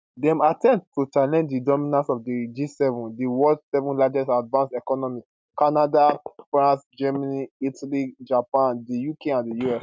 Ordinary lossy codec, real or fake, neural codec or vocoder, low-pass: none; real; none; none